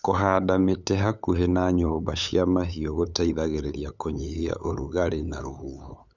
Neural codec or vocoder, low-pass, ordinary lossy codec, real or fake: codec, 16 kHz, 8 kbps, FunCodec, trained on LibriTTS, 25 frames a second; 7.2 kHz; none; fake